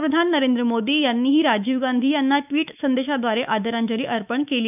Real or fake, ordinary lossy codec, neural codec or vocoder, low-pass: fake; none; autoencoder, 48 kHz, 128 numbers a frame, DAC-VAE, trained on Japanese speech; 3.6 kHz